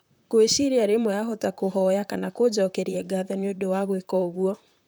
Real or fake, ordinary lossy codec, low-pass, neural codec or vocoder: fake; none; none; vocoder, 44.1 kHz, 128 mel bands, Pupu-Vocoder